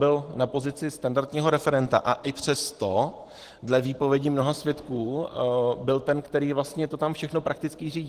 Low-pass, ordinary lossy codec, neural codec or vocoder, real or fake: 14.4 kHz; Opus, 16 kbps; vocoder, 44.1 kHz, 128 mel bands every 512 samples, BigVGAN v2; fake